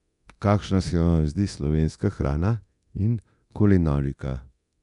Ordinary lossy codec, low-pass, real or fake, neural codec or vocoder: none; 10.8 kHz; fake; codec, 24 kHz, 0.9 kbps, DualCodec